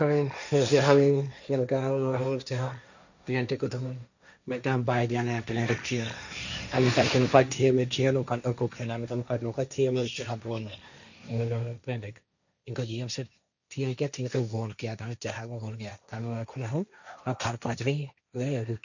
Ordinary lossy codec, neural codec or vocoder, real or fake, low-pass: none; codec, 16 kHz, 1.1 kbps, Voila-Tokenizer; fake; 7.2 kHz